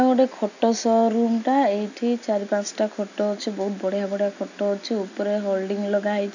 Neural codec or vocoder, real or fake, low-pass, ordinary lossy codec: none; real; 7.2 kHz; none